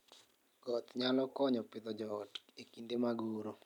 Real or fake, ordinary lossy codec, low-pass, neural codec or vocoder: real; none; 19.8 kHz; none